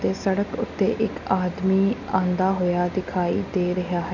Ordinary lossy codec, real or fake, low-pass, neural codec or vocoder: none; real; 7.2 kHz; none